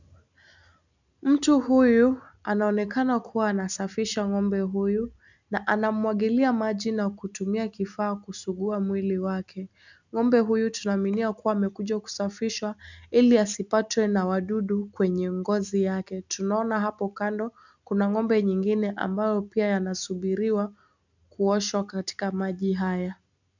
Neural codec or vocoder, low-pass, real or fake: none; 7.2 kHz; real